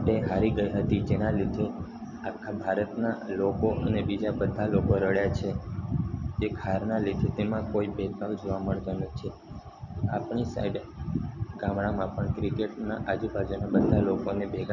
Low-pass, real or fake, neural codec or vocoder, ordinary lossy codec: 7.2 kHz; real; none; none